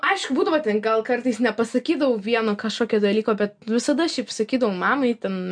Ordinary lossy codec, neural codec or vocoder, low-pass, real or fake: MP3, 64 kbps; none; 9.9 kHz; real